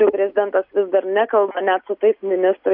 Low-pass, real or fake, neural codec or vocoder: 5.4 kHz; real; none